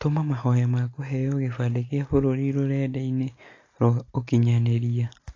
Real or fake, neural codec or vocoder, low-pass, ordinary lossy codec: real; none; 7.2 kHz; AAC, 32 kbps